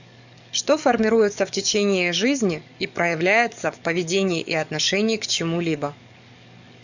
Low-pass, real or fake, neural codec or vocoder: 7.2 kHz; fake; codec, 44.1 kHz, 7.8 kbps, DAC